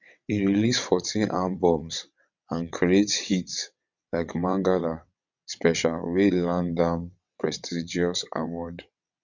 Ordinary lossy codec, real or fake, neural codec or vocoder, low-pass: none; fake; vocoder, 22.05 kHz, 80 mel bands, WaveNeXt; 7.2 kHz